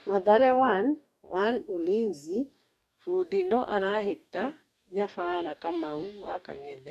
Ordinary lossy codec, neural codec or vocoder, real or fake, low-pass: none; codec, 44.1 kHz, 2.6 kbps, DAC; fake; 14.4 kHz